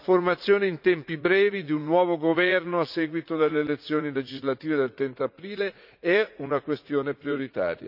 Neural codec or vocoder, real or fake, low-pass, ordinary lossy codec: vocoder, 44.1 kHz, 80 mel bands, Vocos; fake; 5.4 kHz; none